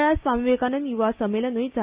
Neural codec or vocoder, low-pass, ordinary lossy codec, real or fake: none; 3.6 kHz; Opus, 64 kbps; real